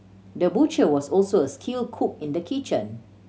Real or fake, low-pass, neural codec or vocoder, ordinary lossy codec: real; none; none; none